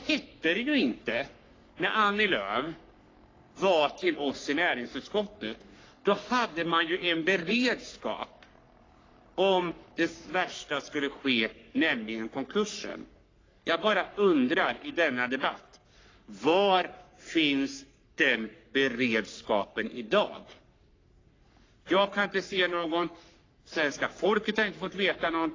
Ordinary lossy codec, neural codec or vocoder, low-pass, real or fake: AAC, 32 kbps; codec, 44.1 kHz, 3.4 kbps, Pupu-Codec; 7.2 kHz; fake